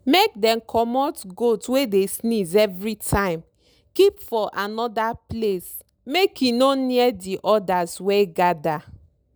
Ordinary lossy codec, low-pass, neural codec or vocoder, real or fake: none; none; none; real